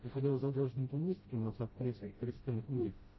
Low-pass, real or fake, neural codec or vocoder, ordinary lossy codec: 7.2 kHz; fake; codec, 16 kHz, 0.5 kbps, FreqCodec, smaller model; MP3, 24 kbps